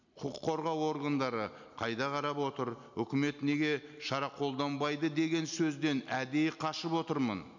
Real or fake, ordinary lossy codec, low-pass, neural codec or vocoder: real; none; 7.2 kHz; none